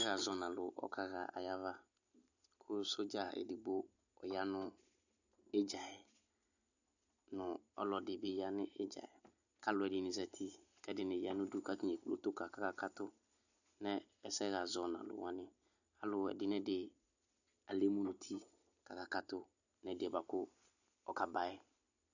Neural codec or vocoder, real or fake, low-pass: none; real; 7.2 kHz